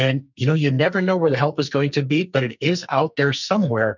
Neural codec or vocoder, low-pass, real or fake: codec, 44.1 kHz, 2.6 kbps, SNAC; 7.2 kHz; fake